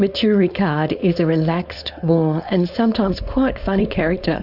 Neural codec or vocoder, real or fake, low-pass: codec, 16 kHz, 4.8 kbps, FACodec; fake; 5.4 kHz